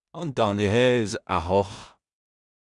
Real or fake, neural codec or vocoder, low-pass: fake; codec, 16 kHz in and 24 kHz out, 0.4 kbps, LongCat-Audio-Codec, two codebook decoder; 10.8 kHz